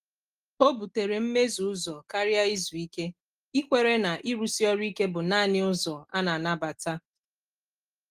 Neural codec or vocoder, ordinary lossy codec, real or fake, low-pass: none; Opus, 24 kbps; real; 14.4 kHz